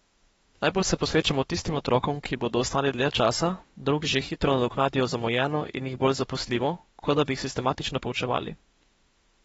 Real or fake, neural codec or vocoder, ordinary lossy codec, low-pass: fake; autoencoder, 48 kHz, 32 numbers a frame, DAC-VAE, trained on Japanese speech; AAC, 24 kbps; 19.8 kHz